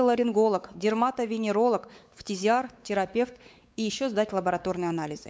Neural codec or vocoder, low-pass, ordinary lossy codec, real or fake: codec, 16 kHz, 4 kbps, X-Codec, WavLM features, trained on Multilingual LibriSpeech; none; none; fake